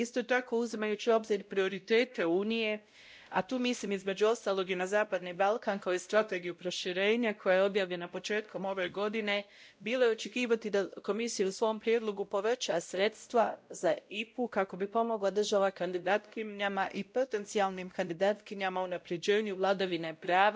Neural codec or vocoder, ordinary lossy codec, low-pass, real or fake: codec, 16 kHz, 0.5 kbps, X-Codec, WavLM features, trained on Multilingual LibriSpeech; none; none; fake